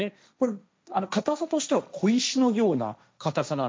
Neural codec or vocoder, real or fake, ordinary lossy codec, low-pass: codec, 16 kHz, 1.1 kbps, Voila-Tokenizer; fake; none; none